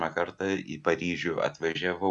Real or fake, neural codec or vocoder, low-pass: real; none; 10.8 kHz